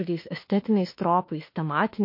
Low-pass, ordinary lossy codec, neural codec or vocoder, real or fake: 5.4 kHz; MP3, 32 kbps; autoencoder, 48 kHz, 32 numbers a frame, DAC-VAE, trained on Japanese speech; fake